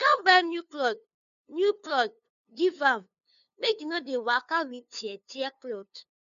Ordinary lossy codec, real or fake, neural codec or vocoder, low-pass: none; fake; codec, 16 kHz, 2 kbps, FunCodec, trained on LibriTTS, 25 frames a second; 7.2 kHz